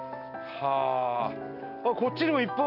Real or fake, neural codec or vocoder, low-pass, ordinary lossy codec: real; none; 5.4 kHz; none